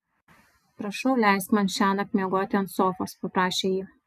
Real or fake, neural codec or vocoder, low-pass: real; none; 14.4 kHz